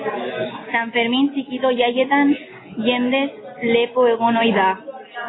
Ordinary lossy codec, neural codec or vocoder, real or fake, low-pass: AAC, 16 kbps; none; real; 7.2 kHz